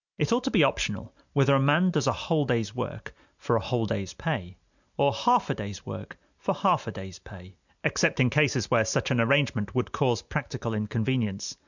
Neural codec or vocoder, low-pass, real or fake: none; 7.2 kHz; real